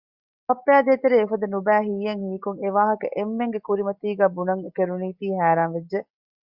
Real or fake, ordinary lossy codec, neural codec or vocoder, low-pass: real; Opus, 64 kbps; none; 5.4 kHz